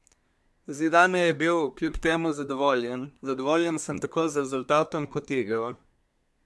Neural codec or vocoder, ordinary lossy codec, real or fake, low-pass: codec, 24 kHz, 1 kbps, SNAC; none; fake; none